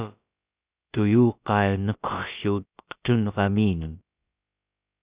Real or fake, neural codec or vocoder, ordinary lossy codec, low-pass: fake; codec, 16 kHz, about 1 kbps, DyCAST, with the encoder's durations; Opus, 64 kbps; 3.6 kHz